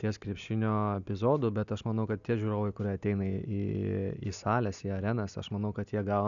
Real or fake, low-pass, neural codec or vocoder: real; 7.2 kHz; none